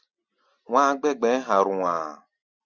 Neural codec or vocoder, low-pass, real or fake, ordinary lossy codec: none; 7.2 kHz; real; Opus, 64 kbps